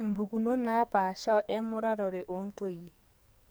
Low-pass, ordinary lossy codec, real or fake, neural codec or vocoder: none; none; fake; codec, 44.1 kHz, 2.6 kbps, SNAC